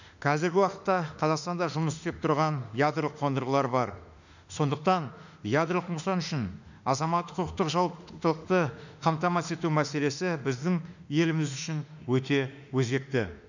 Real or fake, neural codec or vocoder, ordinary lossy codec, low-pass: fake; autoencoder, 48 kHz, 32 numbers a frame, DAC-VAE, trained on Japanese speech; none; 7.2 kHz